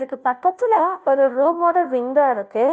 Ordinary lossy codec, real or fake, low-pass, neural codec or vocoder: none; fake; none; codec, 16 kHz, 0.5 kbps, FunCodec, trained on Chinese and English, 25 frames a second